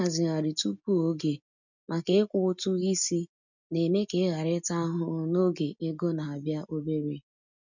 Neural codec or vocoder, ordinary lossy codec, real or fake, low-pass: none; none; real; 7.2 kHz